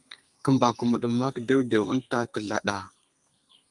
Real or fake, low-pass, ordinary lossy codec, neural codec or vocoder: fake; 10.8 kHz; Opus, 32 kbps; codec, 32 kHz, 1.9 kbps, SNAC